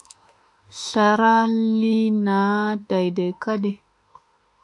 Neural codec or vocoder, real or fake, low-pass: autoencoder, 48 kHz, 32 numbers a frame, DAC-VAE, trained on Japanese speech; fake; 10.8 kHz